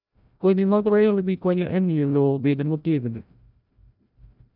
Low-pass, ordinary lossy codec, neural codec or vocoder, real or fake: 5.4 kHz; none; codec, 16 kHz, 0.5 kbps, FreqCodec, larger model; fake